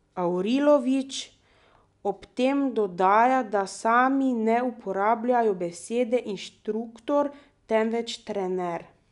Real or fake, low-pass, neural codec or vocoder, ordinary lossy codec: real; 10.8 kHz; none; none